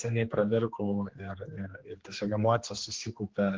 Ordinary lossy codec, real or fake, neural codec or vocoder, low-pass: Opus, 16 kbps; fake; codec, 16 kHz, 2 kbps, X-Codec, HuBERT features, trained on general audio; 7.2 kHz